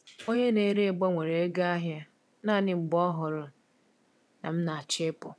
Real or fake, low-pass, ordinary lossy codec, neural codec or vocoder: fake; none; none; vocoder, 22.05 kHz, 80 mel bands, WaveNeXt